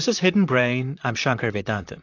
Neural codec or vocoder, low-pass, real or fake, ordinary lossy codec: vocoder, 44.1 kHz, 128 mel bands, Pupu-Vocoder; 7.2 kHz; fake; AAC, 48 kbps